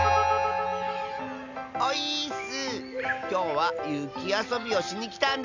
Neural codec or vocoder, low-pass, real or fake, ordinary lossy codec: none; 7.2 kHz; real; none